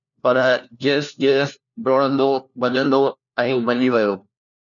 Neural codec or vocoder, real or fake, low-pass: codec, 16 kHz, 1 kbps, FunCodec, trained on LibriTTS, 50 frames a second; fake; 7.2 kHz